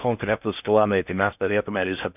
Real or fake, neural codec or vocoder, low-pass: fake; codec, 16 kHz in and 24 kHz out, 0.6 kbps, FocalCodec, streaming, 4096 codes; 3.6 kHz